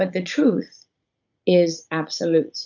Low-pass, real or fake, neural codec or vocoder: 7.2 kHz; fake; vocoder, 44.1 kHz, 80 mel bands, Vocos